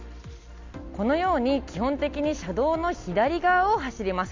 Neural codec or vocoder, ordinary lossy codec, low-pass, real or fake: none; MP3, 64 kbps; 7.2 kHz; real